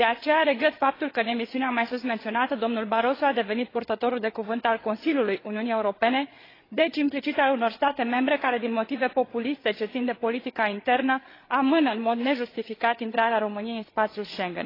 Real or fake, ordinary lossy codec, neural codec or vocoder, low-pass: fake; AAC, 24 kbps; codec, 16 kHz, 8 kbps, FreqCodec, larger model; 5.4 kHz